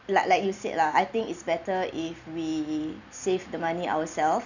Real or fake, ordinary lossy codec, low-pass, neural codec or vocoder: fake; none; 7.2 kHz; vocoder, 44.1 kHz, 128 mel bands every 256 samples, BigVGAN v2